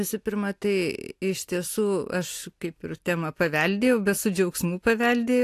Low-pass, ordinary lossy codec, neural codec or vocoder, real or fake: 14.4 kHz; AAC, 64 kbps; none; real